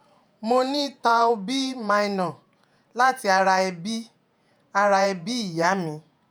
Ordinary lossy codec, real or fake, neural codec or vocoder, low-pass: none; fake; vocoder, 48 kHz, 128 mel bands, Vocos; none